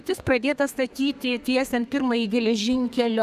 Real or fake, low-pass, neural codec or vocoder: fake; 14.4 kHz; codec, 32 kHz, 1.9 kbps, SNAC